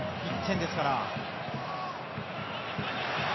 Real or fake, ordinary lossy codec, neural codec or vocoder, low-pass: real; MP3, 24 kbps; none; 7.2 kHz